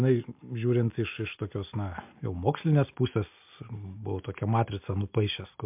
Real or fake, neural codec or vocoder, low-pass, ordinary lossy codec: real; none; 3.6 kHz; MP3, 32 kbps